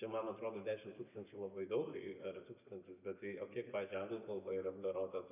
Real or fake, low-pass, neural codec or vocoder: real; 3.6 kHz; none